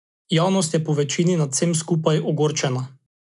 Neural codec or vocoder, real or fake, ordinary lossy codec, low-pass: none; real; none; 10.8 kHz